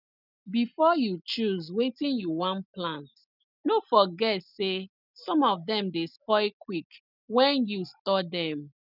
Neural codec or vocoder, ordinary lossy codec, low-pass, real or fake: none; none; 5.4 kHz; real